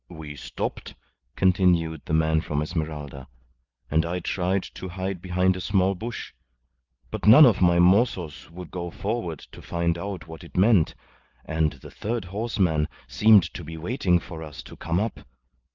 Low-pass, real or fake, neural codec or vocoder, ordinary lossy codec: 7.2 kHz; real; none; Opus, 24 kbps